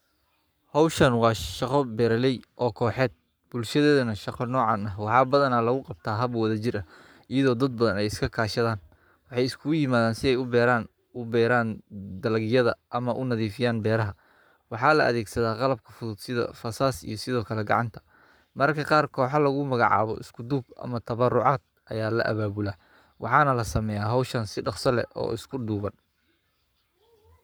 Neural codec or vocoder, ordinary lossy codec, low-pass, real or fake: codec, 44.1 kHz, 7.8 kbps, Pupu-Codec; none; none; fake